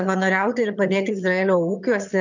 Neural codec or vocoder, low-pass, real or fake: vocoder, 22.05 kHz, 80 mel bands, HiFi-GAN; 7.2 kHz; fake